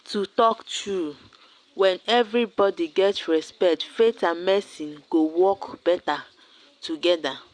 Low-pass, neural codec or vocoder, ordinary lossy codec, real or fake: 9.9 kHz; none; Opus, 64 kbps; real